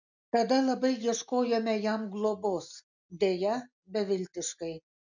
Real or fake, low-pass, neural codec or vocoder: real; 7.2 kHz; none